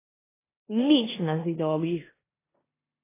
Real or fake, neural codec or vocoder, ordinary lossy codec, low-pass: fake; codec, 16 kHz in and 24 kHz out, 0.9 kbps, LongCat-Audio-Codec, four codebook decoder; AAC, 16 kbps; 3.6 kHz